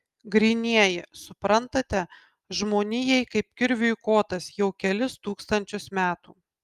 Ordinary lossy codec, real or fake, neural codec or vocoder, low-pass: Opus, 32 kbps; real; none; 14.4 kHz